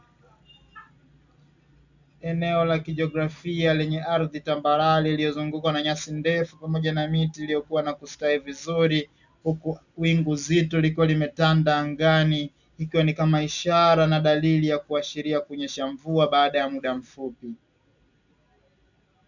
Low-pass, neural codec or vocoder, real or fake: 7.2 kHz; none; real